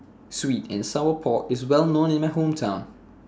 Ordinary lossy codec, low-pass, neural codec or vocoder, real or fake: none; none; none; real